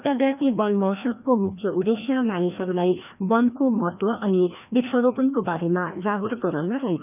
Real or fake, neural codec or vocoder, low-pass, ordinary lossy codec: fake; codec, 16 kHz, 1 kbps, FreqCodec, larger model; 3.6 kHz; none